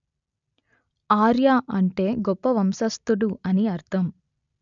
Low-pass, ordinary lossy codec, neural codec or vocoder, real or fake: 7.2 kHz; none; none; real